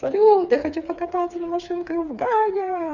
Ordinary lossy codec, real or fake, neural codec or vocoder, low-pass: none; fake; codec, 16 kHz, 4 kbps, FreqCodec, smaller model; 7.2 kHz